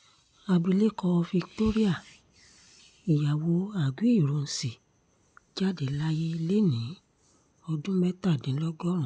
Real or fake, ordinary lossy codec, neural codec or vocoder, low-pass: real; none; none; none